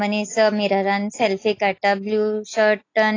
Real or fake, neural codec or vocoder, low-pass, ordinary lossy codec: real; none; 7.2 kHz; AAC, 32 kbps